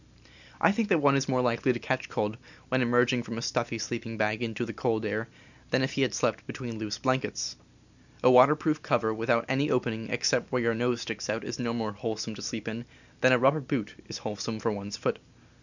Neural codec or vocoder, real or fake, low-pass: none; real; 7.2 kHz